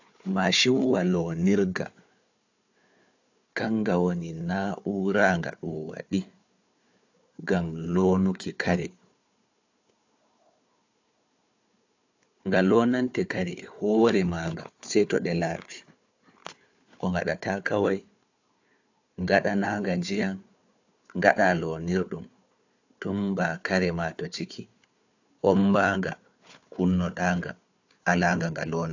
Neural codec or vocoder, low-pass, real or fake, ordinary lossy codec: codec, 16 kHz, 4 kbps, FunCodec, trained on Chinese and English, 50 frames a second; 7.2 kHz; fake; AAC, 48 kbps